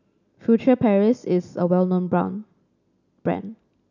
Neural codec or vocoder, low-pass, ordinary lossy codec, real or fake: none; 7.2 kHz; none; real